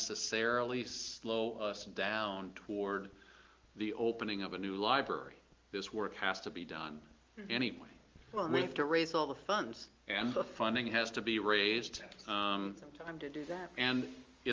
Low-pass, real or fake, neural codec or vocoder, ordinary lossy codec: 7.2 kHz; real; none; Opus, 32 kbps